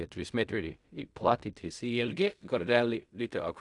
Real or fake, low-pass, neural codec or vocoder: fake; 10.8 kHz; codec, 16 kHz in and 24 kHz out, 0.4 kbps, LongCat-Audio-Codec, fine tuned four codebook decoder